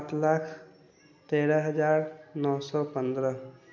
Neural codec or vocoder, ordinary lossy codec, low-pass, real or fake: none; none; none; real